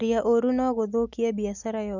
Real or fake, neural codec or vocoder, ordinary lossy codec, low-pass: real; none; none; 7.2 kHz